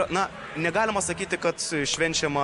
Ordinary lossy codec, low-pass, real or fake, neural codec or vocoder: MP3, 64 kbps; 10.8 kHz; real; none